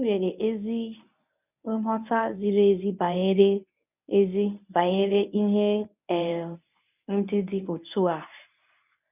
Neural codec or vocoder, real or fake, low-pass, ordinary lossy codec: codec, 24 kHz, 0.9 kbps, WavTokenizer, medium speech release version 1; fake; 3.6 kHz; none